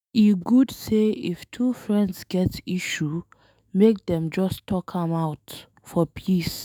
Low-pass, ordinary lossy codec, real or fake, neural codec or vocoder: none; none; fake; autoencoder, 48 kHz, 128 numbers a frame, DAC-VAE, trained on Japanese speech